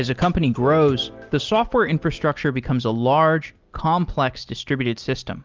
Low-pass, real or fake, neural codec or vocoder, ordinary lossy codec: 7.2 kHz; real; none; Opus, 32 kbps